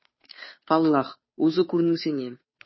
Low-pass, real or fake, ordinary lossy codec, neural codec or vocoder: 7.2 kHz; fake; MP3, 24 kbps; codec, 16 kHz, 2 kbps, FunCodec, trained on Chinese and English, 25 frames a second